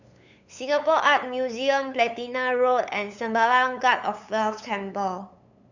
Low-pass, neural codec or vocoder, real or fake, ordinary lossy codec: 7.2 kHz; codec, 16 kHz, 8 kbps, FunCodec, trained on LibriTTS, 25 frames a second; fake; none